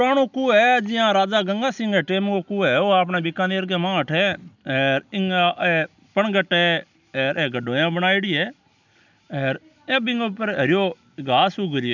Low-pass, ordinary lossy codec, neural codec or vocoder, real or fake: 7.2 kHz; none; none; real